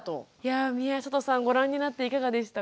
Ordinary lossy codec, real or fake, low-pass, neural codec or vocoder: none; real; none; none